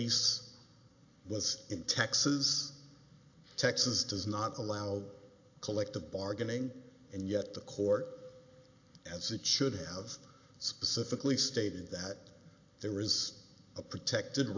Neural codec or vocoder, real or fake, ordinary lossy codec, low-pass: none; real; AAC, 48 kbps; 7.2 kHz